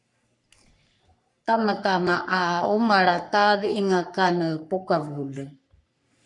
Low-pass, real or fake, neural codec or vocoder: 10.8 kHz; fake; codec, 44.1 kHz, 3.4 kbps, Pupu-Codec